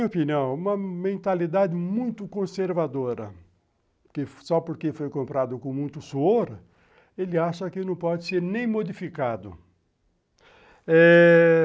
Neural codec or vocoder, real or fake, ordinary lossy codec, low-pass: none; real; none; none